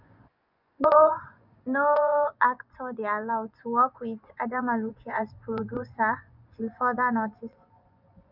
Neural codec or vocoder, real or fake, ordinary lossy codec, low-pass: vocoder, 24 kHz, 100 mel bands, Vocos; fake; none; 5.4 kHz